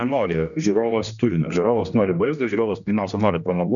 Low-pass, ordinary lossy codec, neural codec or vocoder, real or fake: 7.2 kHz; MP3, 96 kbps; codec, 16 kHz, 1 kbps, X-Codec, HuBERT features, trained on general audio; fake